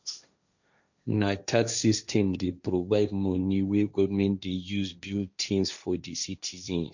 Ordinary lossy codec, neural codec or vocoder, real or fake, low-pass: none; codec, 16 kHz, 1.1 kbps, Voila-Tokenizer; fake; 7.2 kHz